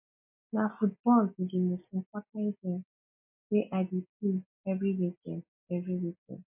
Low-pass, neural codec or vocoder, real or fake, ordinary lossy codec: 3.6 kHz; none; real; MP3, 32 kbps